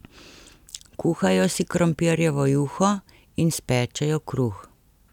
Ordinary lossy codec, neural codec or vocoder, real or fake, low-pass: none; none; real; 19.8 kHz